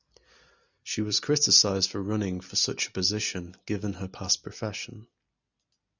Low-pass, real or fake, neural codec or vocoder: 7.2 kHz; real; none